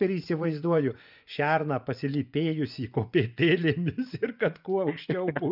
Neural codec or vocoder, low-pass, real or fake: vocoder, 24 kHz, 100 mel bands, Vocos; 5.4 kHz; fake